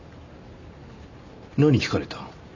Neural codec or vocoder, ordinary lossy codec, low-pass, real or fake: none; none; 7.2 kHz; real